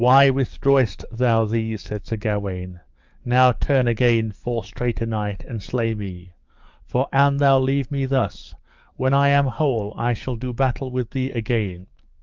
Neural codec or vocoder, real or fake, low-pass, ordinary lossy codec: codec, 44.1 kHz, 7.8 kbps, DAC; fake; 7.2 kHz; Opus, 24 kbps